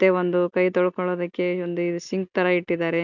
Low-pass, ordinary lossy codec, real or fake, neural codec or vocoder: 7.2 kHz; none; real; none